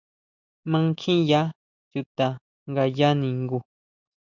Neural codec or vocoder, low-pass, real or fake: none; 7.2 kHz; real